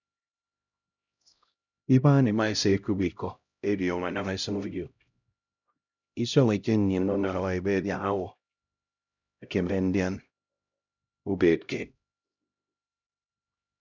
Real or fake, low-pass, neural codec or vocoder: fake; 7.2 kHz; codec, 16 kHz, 0.5 kbps, X-Codec, HuBERT features, trained on LibriSpeech